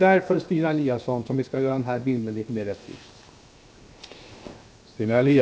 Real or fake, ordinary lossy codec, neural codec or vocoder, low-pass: fake; none; codec, 16 kHz, 0.7 kbps, FocalCodec; none